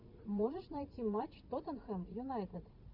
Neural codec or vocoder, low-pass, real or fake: vocoder, 44.1 kHz, 80 mel bands, Vocos; 5.4 kHz; fake